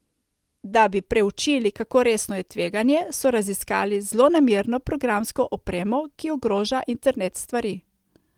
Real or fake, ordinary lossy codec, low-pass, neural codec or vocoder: real; Opus, 24 kbps; 19.8 kHz; none